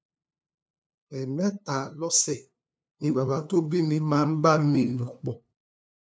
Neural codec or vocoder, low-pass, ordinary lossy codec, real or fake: codec, 16 kHz, 2 kbps, FunCodec, trained on LibriTTS, 25 frames a second; none; none; fake